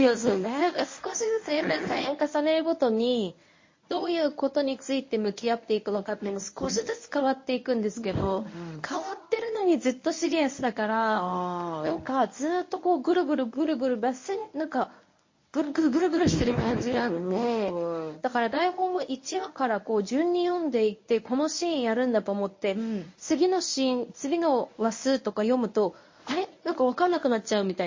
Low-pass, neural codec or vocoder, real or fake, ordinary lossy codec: 7.2 kHz; codec, 24 kHz, 0.9 kbps, WavTokenizer, medium speech release version 1; fake; MP3, 32 kbps